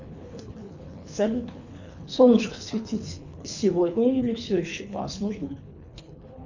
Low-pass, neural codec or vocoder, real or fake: 7.2 kHz; codec, 24 kHz, 3 kbps, HILCodec; fake